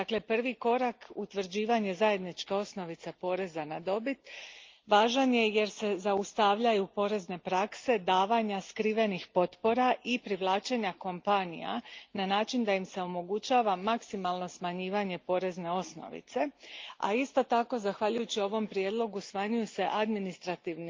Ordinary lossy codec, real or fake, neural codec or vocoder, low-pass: Opus, 24 kbps; real; none; 7.2 kHz